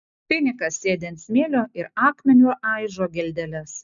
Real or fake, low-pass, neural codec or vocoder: real; 7.2 kHz; none